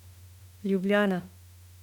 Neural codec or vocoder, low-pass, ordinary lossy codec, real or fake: autoencoder, 48 kHz, 32 numbers a frame, DAC-VAE, trained on Japanese speech; 19.8 kHz; none; fake